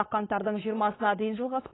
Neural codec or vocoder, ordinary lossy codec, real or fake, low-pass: codec, 16 kHz, 4.8 kbps, FACodec; AAC, 16 kbps; fake; 7.2 kHz